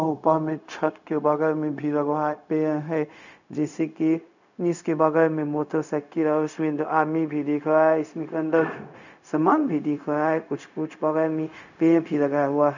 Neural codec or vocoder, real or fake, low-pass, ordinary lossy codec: codec, 16 kHz, 0.4 kbps, LongCat-Audio-Codec; fake; 7.2 kHz; none